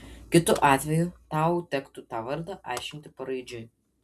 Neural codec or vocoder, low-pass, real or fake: none; 14.4 kHz; real